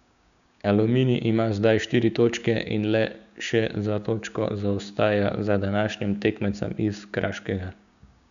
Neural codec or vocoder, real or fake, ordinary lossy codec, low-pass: codec, 16 kHz, 6 kbps, DAC; fake; none; 7.2 kHz